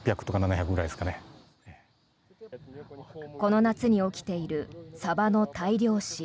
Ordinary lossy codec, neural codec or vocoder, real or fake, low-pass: none; none; real; none